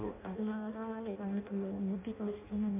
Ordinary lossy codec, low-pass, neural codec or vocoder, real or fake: MP3, 32 kbps; 3.6 kHz; codec, 16 kHz in and 24 kHz out, 0.6 kbps, FireRedTTS-2 codec; fake